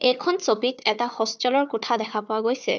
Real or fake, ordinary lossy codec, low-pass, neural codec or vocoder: fake; none; none; codec, 16 kHz, 4 kbps, FunCodec, trained on Chinese and English, 50 frames a second